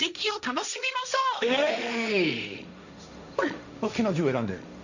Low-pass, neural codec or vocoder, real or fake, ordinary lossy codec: 7.2 kHz; codec, 16 kHz, 1.1 kbps, Voila-Tokenizer; fake; none